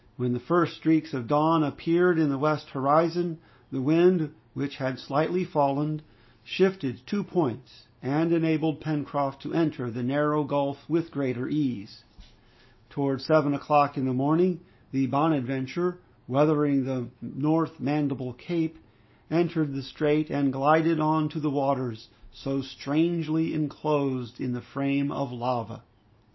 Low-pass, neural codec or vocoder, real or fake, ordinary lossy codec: 7.2 kHz; none; real; MP3, 24 kbps